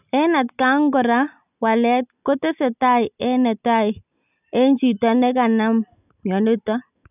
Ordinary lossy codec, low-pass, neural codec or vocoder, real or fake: none; 3.6 kHz; none; real